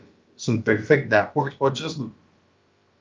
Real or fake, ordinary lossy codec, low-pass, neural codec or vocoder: fake; Opus, 24 kbps; 7.2 kHz; codec, 16 kHz, about 1 kbps, DyCAST, with the encoder's durations